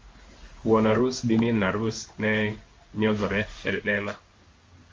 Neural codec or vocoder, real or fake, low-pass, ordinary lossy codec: codec, 16 kHz in and 24 kHz out, 1 kbps, XY-Tokenizer; fake; 7.2 kHz; Opus, 32 kbps